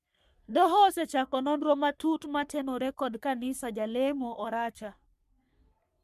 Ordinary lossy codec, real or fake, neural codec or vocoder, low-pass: MP3, 96 kbps; fake; codec, 44.1 kHz, 3.4 kbps, Pupu-Codec; 14.4 kHz